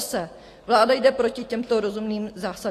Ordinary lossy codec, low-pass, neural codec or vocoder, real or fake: AAC, 48 kbps; 14.4 kHz; none; real